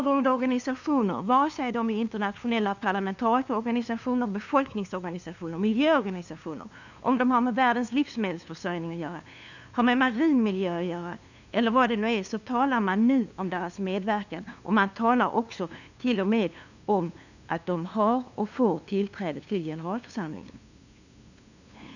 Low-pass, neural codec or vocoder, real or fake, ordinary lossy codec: 7.2 kHz; codec, 16 kHz, 2 kbps, FunCodec, trained on LibriTTS, 25 frames a second; fake; none